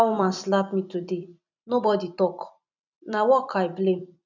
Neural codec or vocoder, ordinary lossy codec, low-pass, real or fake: vocoder, 44.1 kHz, 128 mel bands every 256 samples, BigVGAN v2; none; 7.2 kHz; fake